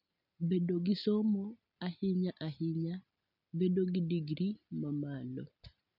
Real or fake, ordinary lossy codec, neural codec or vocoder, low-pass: real; none; none; 5.4 kHz